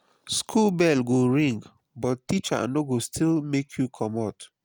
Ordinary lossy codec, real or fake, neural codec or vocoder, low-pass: none; real; none; none